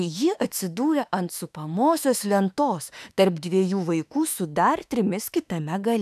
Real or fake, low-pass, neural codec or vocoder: fake; 14.4 kHz; autoencoder, 48 kHz, 32 numbers a frame, DAC-VAE, trained on Japanese speech